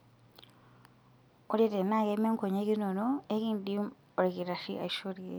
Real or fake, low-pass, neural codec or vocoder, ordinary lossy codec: real; none; none; none